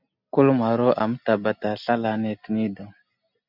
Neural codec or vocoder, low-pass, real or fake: none; 5.4 kHz; real